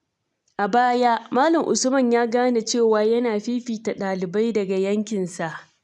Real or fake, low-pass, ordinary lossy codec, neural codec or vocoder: real; none; none; none